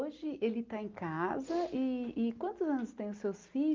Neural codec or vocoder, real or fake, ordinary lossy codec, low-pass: none; real; Opus, 24 kbps; 7.2 kHz